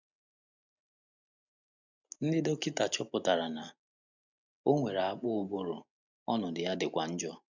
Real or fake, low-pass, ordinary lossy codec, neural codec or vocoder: real; 7.2 kHz; none; none